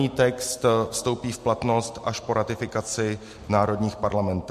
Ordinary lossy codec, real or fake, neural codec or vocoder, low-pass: MP3, 64 kbps; real; none; 14.4 kHz